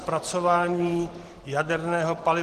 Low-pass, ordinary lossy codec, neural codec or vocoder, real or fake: 14.4 kHz; Opus, 16 kbps; none; real